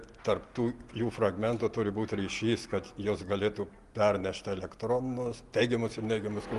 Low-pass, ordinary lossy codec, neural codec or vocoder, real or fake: 10.8 kHz; Opus, 24 kbps; none; real